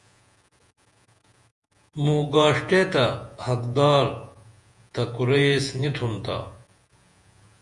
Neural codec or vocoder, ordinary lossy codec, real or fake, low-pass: vocoder, 48 kHz, 128 mel bands, Vocos; Opus, 64 kbps; fake; 10.8 kHz